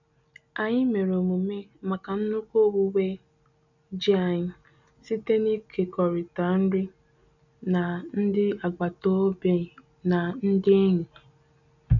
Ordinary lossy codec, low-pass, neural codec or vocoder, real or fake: none; 7.2 kHz; none; real